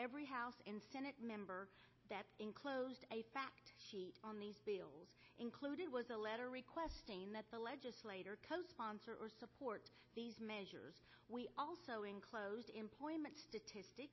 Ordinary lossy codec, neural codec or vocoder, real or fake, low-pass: MP3, 24 kbps; none; real; 7.2 kHz